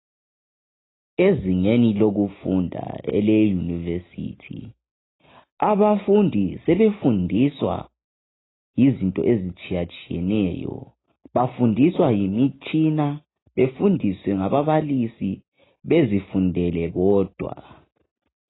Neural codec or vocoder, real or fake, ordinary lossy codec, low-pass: none; real; AAC, 16 kbps; 7.2 kHz